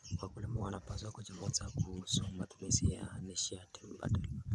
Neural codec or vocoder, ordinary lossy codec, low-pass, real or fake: vocoder, 24 kHz, 100 mel bands, Vocos; none; none; fake